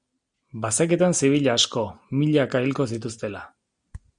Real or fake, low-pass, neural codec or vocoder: real; 9.9 kHz; none